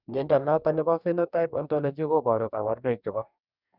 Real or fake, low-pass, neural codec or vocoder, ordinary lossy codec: fake; 5.4 kHz; codec, 44.1 kHz, 2.6 kbps, DAC; none